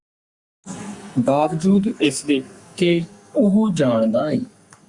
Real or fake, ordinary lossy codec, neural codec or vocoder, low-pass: fake; Opus, 64 kbps; codec, 44.1 kHz, 2.6 kbps, SNAC; 10.8 kHz